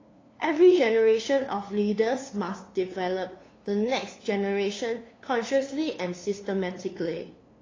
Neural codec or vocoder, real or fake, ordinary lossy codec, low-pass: codec, 16 kHz, 2 kbps, FunCodec, trained on LibriTTS, 25 frames a second; fake; AAC, 32 kbps; 7.2 kHz